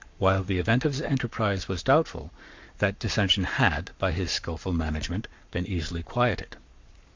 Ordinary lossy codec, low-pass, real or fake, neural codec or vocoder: MP3, 64 kbps; 7.2 kHz; fake; codec, 44.1 kHz, 7.8 kbps, Pupu-Codec